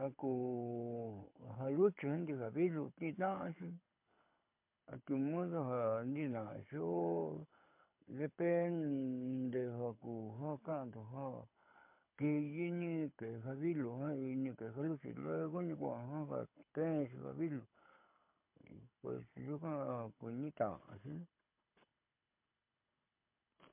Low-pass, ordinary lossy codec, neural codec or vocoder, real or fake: 3.6 kHz; MP3, 32 kbps; codec, 24 kHz, 6 kbps, HILCodec; fake